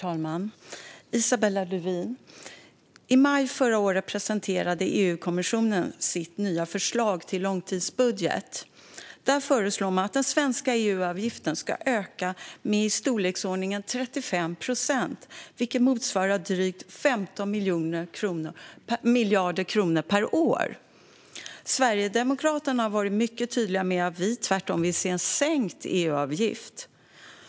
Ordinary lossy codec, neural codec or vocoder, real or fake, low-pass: none; none; real; none